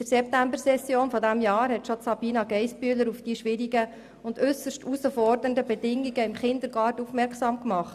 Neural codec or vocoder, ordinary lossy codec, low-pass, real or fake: none; none; 14.4 kHz; real